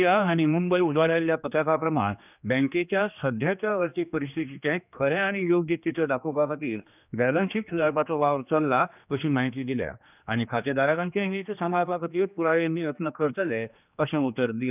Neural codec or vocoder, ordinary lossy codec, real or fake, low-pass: codec, 16 kHz, 2 kbps, X-Codec, HuBERT features, trained on general audio; none; fake; 3.6 kHz